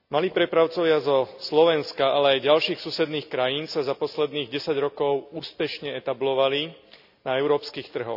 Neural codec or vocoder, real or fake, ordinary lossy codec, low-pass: none; real; none; 5.4 kHz